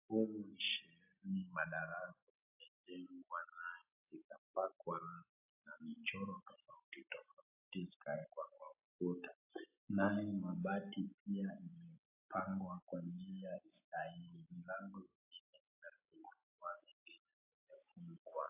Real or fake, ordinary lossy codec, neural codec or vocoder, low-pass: real; AAC, 24 kbps; none; 3.6 kHz